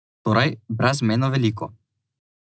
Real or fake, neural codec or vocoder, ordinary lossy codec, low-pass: real; none; none; none